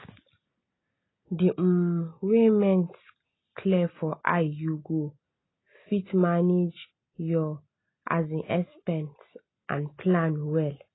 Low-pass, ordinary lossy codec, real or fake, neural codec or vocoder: 7.2 kHz; AAC, 16 kbps; real; none